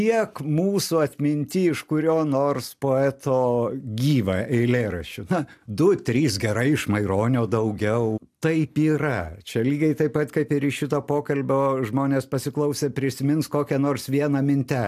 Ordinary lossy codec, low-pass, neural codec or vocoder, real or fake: AAC, 96 kbps; 14.4 kHz; none; real